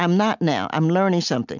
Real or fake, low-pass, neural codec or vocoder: real; 7.2 kHz; none